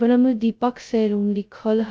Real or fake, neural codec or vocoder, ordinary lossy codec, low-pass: fake; codec, 16 kHz, 0.2 kbps, FocalCodec; none; none